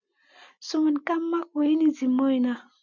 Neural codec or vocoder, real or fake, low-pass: none; real; 7.2 kHz